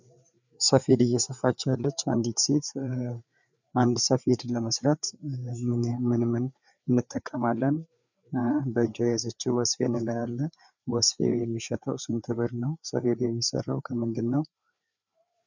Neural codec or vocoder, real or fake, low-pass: codec, 16 kHz, 4 kbps, FreqCodec, larger model; fake; 7.2 kHz